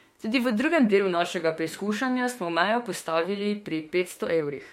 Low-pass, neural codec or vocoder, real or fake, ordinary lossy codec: 19.8 kHz; autoencoder, 48 kHz, 32 numbers a frame, DAC-VAE, trained on Japanese speech; fake; MP3, 64 kbps